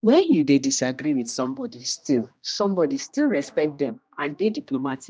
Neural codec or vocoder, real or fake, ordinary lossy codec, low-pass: codec, 16 kHz, 1 kbps, X-Codec, HuBERT features, trained on general audio; fake; none; none